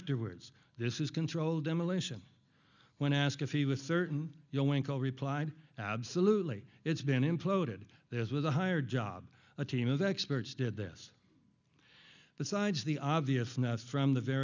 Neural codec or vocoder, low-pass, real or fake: none; 7.2 kHz; real